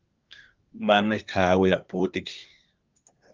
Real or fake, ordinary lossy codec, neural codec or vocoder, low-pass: fake; Opus, 24 kbps; codec, 24 kHz, 1 kbps, SNAC; 7.2 kHz